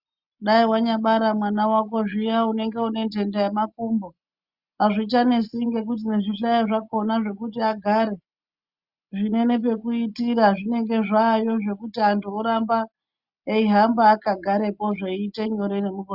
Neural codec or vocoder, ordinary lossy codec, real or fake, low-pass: none; Opus, 64 kbps; real; 5.4 kHz